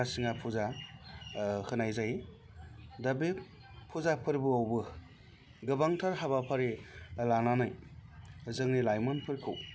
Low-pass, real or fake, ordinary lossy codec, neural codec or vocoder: none; real; none; none